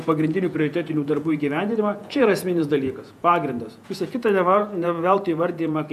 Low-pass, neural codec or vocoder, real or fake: 14.4 kHz; vocoder, 48 kHz, 128 mel bands, Vocos; fake